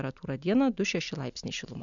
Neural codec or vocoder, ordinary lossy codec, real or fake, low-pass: none; MP3, 96 kbps; real; 7.2 kHz